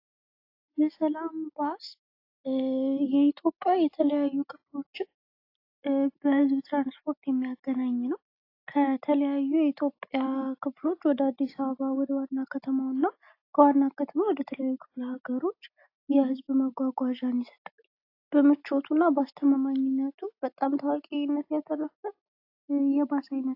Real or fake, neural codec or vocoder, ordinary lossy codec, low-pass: real; none; AAC, 32 kbps; 5.4 kHz